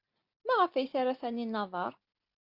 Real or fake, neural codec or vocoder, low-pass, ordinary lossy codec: real; none; 5.4 kHz; Opus, 64 kbps